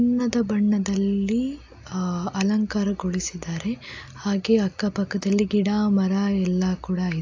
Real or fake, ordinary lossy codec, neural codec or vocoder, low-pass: real; AAC, 48 kbps; none; 7.2 kHz